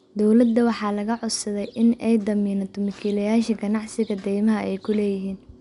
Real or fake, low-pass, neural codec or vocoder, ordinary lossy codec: real; 10.8 kHz; none; none